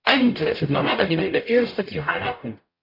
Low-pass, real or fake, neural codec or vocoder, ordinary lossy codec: 5.4 kHz; fake; codec, 44.1 kHz, 0.9 kbps, DAC; MP3, 32 kbps